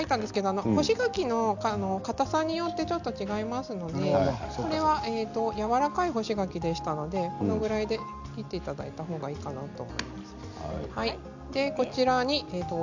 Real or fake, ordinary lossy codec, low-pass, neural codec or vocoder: real; none; 7.2 kHz; none